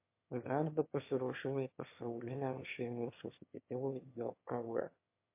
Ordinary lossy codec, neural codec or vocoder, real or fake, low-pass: MP3, 24 kbps; autoencoder, 22.05 kHz, a latent of 192 numbers a frame, VITS, trained on one speaker; fake; 3.6 kHz